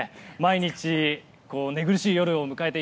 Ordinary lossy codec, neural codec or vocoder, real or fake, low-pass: none; none; real; none